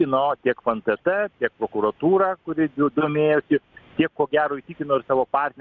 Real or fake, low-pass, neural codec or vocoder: real; 7.2 kHz; none